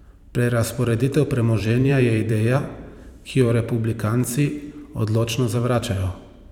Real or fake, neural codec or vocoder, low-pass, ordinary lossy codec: fake; vocoder, 44.1 kHz, 128 mel bands every 512 samples, BigVGAN v2; 19.8 kHz; none